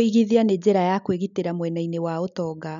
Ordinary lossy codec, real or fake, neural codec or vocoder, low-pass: none; real; none; 7.2 kHz